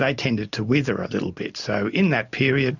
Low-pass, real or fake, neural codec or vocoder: 7.2 kHz; real; none